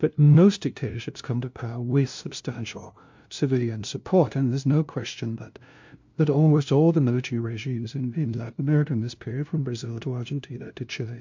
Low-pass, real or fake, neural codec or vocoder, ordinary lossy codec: 7.2 kHz; fake; codec, 16 kHz, 0.5 kbps, FunCodec, trained on LibriTTS, 25 frames a second; MP3, 48 kbps